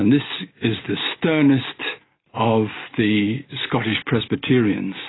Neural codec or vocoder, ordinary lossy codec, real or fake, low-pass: none; AAC, 16 kbps; real; 7.2 kHz